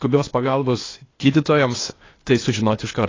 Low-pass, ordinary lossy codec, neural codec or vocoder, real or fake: 7.2 kHz; AAC, 32 kbps; codec, 16 kHz, 0.8 kbps, ZipCodec; fake